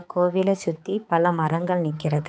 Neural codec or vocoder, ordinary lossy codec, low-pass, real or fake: codec, 16 kHz, 4 kbps, X-Codec, HuBERT features, trained on balanced general audio; none; none; fake